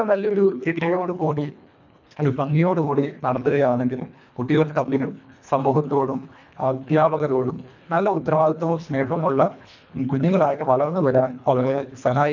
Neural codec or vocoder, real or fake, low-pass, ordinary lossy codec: codec, 24 kHz, 1.5 kbps, HILCodec; fake; 7.2 kHz; none